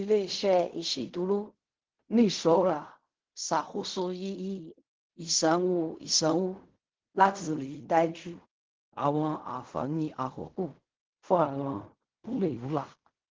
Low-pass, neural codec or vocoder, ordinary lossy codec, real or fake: 7.2 kHz; codec, 16 kHz in and 24 kHz out, 0.4 kbps, LongCat-Audio-Codec, fine tuned four codebook decoder; Opus, 16 kbps; fake